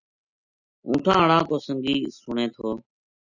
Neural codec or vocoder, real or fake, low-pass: none; real; 7.2 kHz